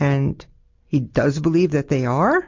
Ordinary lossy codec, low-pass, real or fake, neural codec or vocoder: MP3, 48 kbps; 7.2 kHz; real; none